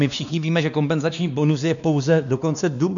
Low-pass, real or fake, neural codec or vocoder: 7.2 kHz; fake; codec, 16 kHz, 2 kbps, X-Codec, WavLM features, trained on Multilingual LibriSpeech